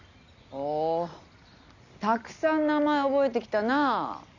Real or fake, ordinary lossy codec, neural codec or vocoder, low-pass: real; none; none; 7.2 kHz